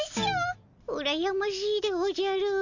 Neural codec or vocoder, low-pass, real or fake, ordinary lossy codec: none; 7.2 kHz; real; none